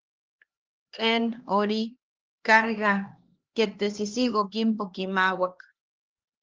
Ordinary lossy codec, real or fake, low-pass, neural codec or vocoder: Opus, 16 kbps; fake; 7.2 kHz; codec, 16 kHz, 2 kbps, X-Codec, HuBERT features, trained on LibriSpeech